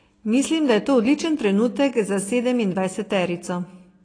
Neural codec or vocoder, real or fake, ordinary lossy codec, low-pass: none; real; AAC, 32 kbps; 9.9 kHz